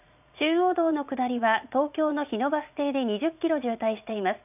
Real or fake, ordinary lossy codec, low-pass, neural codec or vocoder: fake; none; 3.6 kHz; vocoder, 44.1 kHz, 80 mel bands, Vocos